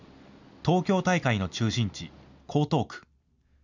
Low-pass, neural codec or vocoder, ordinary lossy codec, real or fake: 7.2 kHz; none; none; real